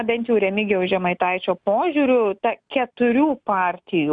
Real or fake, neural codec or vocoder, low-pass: real; none; 9.9 kHz